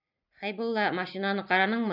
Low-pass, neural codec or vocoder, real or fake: 5.4 kHz; none; real